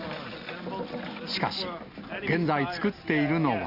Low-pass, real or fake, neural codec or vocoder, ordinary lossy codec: 5.4 kHz; real; none; none